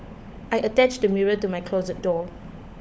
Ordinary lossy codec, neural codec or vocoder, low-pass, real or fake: none; codec, 16 kHz, 16 kbps, FunCodec, trained on LibriTTS, 50 frames a second; none; fake